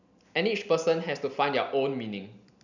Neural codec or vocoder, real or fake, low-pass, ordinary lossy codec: none; real; 7.2 kHz; none